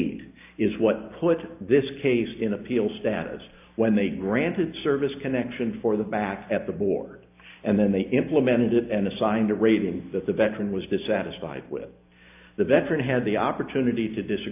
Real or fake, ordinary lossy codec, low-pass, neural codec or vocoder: real; AAC, 32 kbps; 3.6 kHz; none